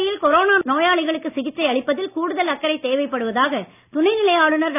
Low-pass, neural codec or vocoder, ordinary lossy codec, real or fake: 3.6 kHz; none; none; real